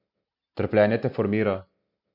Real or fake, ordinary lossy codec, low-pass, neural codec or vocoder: real; none; 5.4 kHz; none